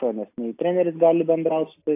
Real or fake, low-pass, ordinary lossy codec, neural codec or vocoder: real; 3.6 kHz; AAC, 24 kbps; none